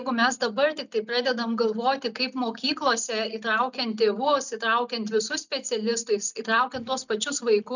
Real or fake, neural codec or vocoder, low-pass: real; none; 7.2 kHz